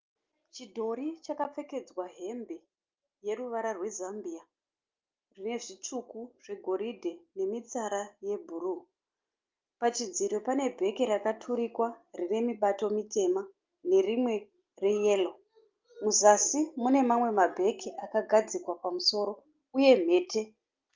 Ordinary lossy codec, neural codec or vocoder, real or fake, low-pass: Opus, 24 kbps; none; real; 7.2 kHz